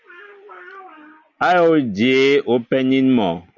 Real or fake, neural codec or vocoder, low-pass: real; none; 7.2 kHz